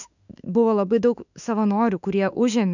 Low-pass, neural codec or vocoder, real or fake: 7.2 kHz; autoencoder, 48 kHz, 32 numbers a frame, DAC-VAE, trained on Japanese speech; fake